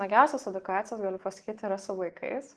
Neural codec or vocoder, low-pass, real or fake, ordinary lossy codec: none; 10.8 kHz; real; Opus, 16 kbps